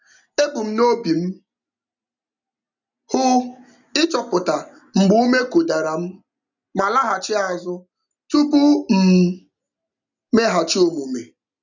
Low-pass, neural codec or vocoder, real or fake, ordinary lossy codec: 7.2 kHz; none; real; none